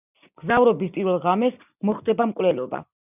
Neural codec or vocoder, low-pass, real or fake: none; 3.6 kHz; real